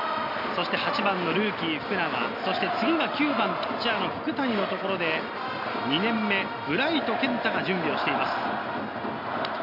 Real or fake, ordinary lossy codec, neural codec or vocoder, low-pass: real; none; none; 5.4 kHz